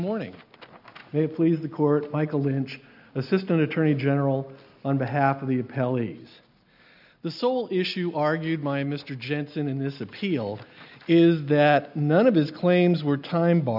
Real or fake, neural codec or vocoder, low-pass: real; none; 5.4 kHz